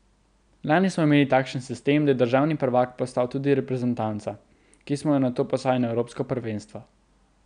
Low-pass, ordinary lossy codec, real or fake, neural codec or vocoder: 9.9 kHz; none; real; none